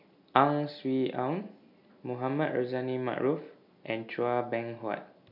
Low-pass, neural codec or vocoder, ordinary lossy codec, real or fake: 5.4 kHz; none; none; real